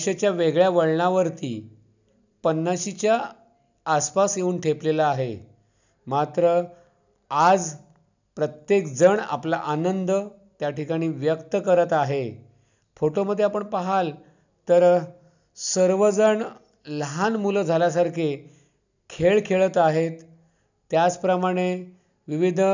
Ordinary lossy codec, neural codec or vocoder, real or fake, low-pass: none; none; real; 7.2 kHz